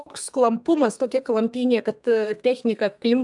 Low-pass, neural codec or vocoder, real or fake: 10.8 kHz; codec, 32 kHz, 1.9 kbps, SNAC; fake